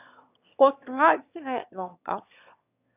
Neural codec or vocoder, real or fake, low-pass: autoencoder, 22.05 kHz, a latent of 192 numbers a frame, VITS, trained on one speaker; fake; 3.6 kHz